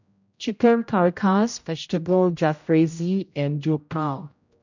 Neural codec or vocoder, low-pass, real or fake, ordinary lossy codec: codec, 16 kHz, 0.5 kbps, X-Codec, HuBERT features, trained on general audio; 7.2 kHz; fake; none